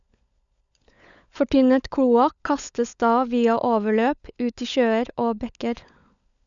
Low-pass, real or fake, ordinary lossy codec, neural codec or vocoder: 7.2 kHz; fake; none; codec, 16 kHz, 16 kbps, FunCodec, trained on LibriTTS, 50 frames a second